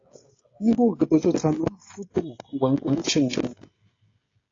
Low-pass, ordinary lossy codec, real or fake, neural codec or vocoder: 7.2 kHz; AAC, 32 kbps; fake; codec, 16 kHz, 16 kbps, FreqCodec, smaller model